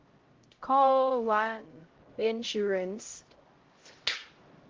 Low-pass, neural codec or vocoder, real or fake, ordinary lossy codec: 7.2 kHz; codec, 16 kHz, 0.5 kbps, X-Codec, HuBERT features, trained on LibriSpeech; fake; Opus, 16 kbps